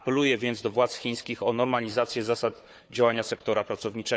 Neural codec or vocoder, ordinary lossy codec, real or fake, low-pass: codec, 16 kHz, 4 kbps, FunCodec, trained on Chinese and English, 50 frames a second; none; fake; none